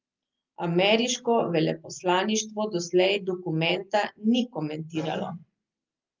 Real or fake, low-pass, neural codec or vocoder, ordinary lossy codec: real; 7.2 kHz; none; Opus, 24 kbps